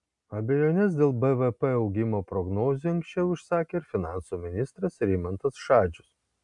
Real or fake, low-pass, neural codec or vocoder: real; 10.8 kHz; none